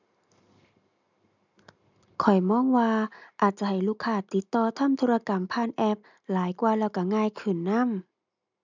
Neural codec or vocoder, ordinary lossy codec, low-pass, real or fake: none; none; 7.2 kHz; real